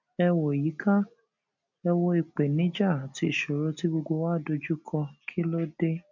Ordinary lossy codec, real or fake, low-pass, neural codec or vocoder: none; real; 7.2 kHz; none